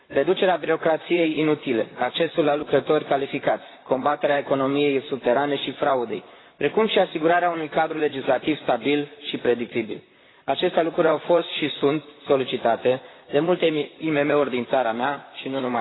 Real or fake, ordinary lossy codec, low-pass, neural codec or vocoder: fake; AAC, 16 kbps; 7.2 kHz; vocoder, 44.1 kHz, 128 mel bands, Pupu-Vocoder